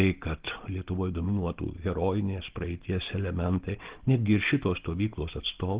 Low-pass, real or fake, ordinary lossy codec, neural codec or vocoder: 3.6 kHz; real; Opus, 16 kbps; none